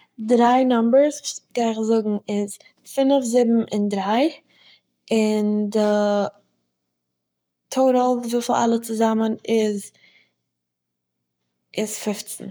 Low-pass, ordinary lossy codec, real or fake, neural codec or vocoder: none; none; fake; codec, 44.1 kHz, 7.8 kbps, Pupu-Codec